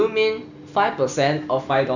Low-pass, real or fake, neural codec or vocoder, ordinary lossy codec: 7.2 kHz; real; none; none